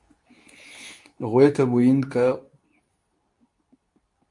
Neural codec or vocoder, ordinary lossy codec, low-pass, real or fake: codec, 24 kHz, 0.9 kbps, WavTokenizer, medium speech release version 2; MP3, 64 kbps; 10.8 kHz; fake